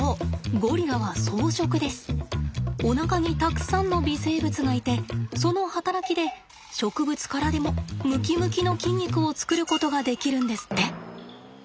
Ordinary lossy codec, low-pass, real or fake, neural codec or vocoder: none; none; real; none